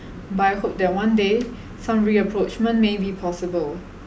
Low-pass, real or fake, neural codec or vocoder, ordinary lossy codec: none; real; none; none